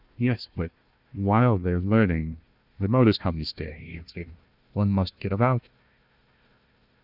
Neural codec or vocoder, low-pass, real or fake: codec, 16 kHz, 1 kbps, FunCodec, trained on Chinese and English, 50 frames a second; 5.4 kHz; fake